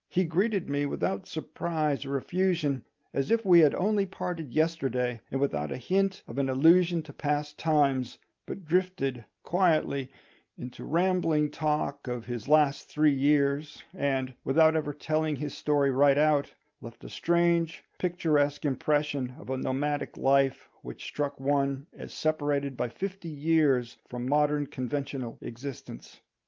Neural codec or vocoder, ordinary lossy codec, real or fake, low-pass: none; Opus, 24 kbps; real; 7.2 kHz